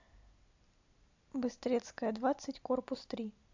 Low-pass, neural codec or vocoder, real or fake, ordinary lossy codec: 7.2 kHz; none; real; AAC, 48 kbps